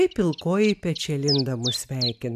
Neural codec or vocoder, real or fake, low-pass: none; real; 14.4 kHz